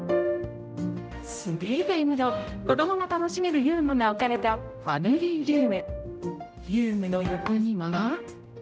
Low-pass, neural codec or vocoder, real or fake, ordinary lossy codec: none; codec, 16 kHz, 0.5 kbps, X-Codec, HuBERT features, trained on general audio; fake; none